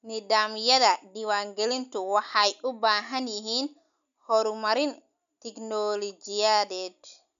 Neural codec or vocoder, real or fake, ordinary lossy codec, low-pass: none; real; none; 7.2 kHz